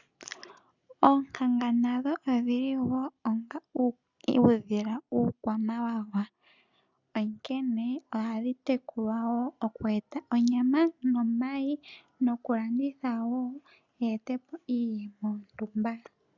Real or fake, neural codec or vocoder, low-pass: real; none; 7.2 kHz